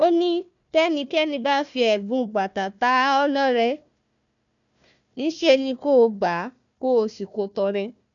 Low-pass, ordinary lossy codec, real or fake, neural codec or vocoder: 7.2 kHz; none; fake; codec, 16 kHz, 1 kbps, FunCodec, trained on Chinese and English, 50 frames a second